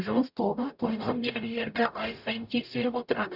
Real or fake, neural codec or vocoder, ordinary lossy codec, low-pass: fake; codec, 44.1 kHz, 0.9 kbps, DAC; none; 5.4 kHz